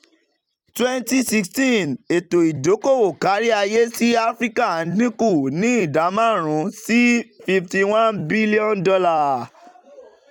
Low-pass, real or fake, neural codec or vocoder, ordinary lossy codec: 19.8 kHz; fake; vocoder, 44.1 kHz, 128 mel bands every 256 samples, BigVGAN v2; none